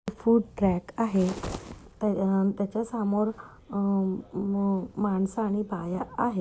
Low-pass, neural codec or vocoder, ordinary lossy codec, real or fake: none; none; none; real